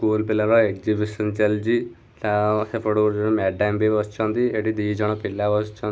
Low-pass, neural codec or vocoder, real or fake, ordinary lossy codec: none; none; real; none